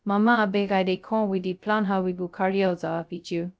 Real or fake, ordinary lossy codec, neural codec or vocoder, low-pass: fake; none; codec, 16 kHz, 0.2 kbps, FocalCodec; none